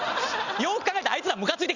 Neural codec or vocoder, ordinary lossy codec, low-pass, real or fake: none; Opus, 64 kbps; 7.2 kHz; real